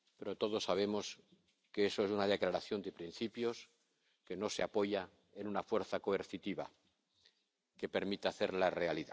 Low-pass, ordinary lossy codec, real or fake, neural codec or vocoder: none; none; real; none